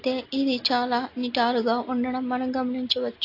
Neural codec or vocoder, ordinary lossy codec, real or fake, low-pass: none; AAC, 32 kbps; real; 5.4 kHz